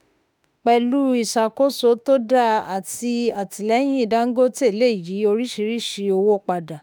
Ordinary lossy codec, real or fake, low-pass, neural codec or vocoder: none; fake; none; autoencoder, 48 kHz, 32 numbers a frame, DAC-VAE, trained on Japanese speech